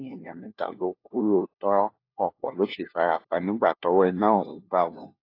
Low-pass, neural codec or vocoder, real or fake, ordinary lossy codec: 5.4 kHz; codec, 16 kHz, 1 kbps, FunCodec, trained on LibriTTS, 50 frames a second; fake; AAC, 32 kbps